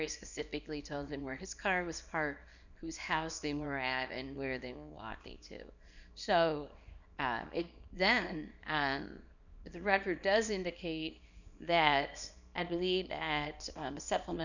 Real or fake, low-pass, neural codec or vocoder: fake; 7.2 kHz; codec, 24 kHz, 0.9 kbps, WavTokenizer, small release